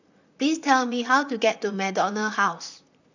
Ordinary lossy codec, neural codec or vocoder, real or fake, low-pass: none; vocoder, 44.1 kHz, 128 mel bands, Pupu-Vocoder; fake; 7.2 kHz